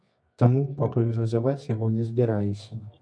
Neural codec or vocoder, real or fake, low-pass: codec, 24 kHz, 0.9 kbps, WavTokenizer, medium music audio release; fake; 9.9 kHz